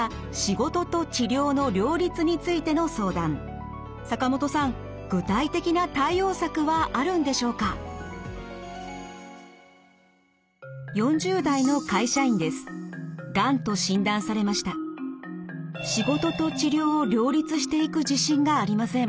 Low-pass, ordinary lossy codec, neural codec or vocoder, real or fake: none; none; none; real